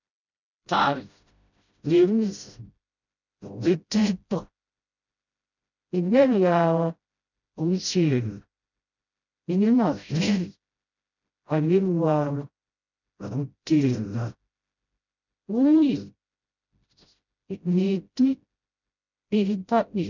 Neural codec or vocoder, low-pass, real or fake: codec, 16 kHz, 0.5 kbps, FreqCodec, smaller model; 7.2 kHz; fake